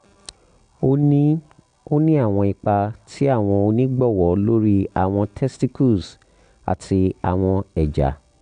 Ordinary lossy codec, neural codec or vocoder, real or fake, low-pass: none; none; real; 9.9 kHz